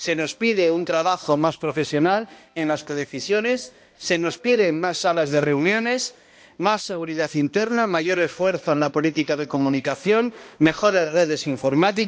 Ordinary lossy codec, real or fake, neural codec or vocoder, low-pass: none; fake; codec, 16 kHz, 1 kbps, X-Codec, HuBERT features, trained on balanced general audio; none